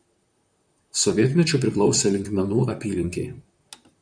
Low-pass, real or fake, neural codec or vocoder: 9.9 kHz; fake; vocoder, 44.1 kHz, 128 mel bands, Pupu-Vocoder